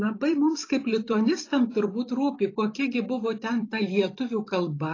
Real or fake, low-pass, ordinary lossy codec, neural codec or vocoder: fake; 7.2 kHz; AAC, 32 kbps; vocoder, 44.1 kHz, 128 mel bands every 256 samples, BigVGAN v2